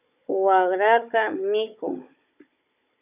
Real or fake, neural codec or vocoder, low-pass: fake; codec, 16 kHz, 16 kbps, FunCodec, trained on Chinese and English, 50 frames a second; 3.6 kHz